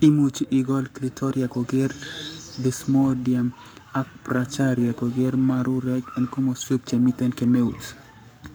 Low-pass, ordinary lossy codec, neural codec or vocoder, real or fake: none; none; codec, 44.1 kHz, 7.8 kbps, Pupu-Codec; fake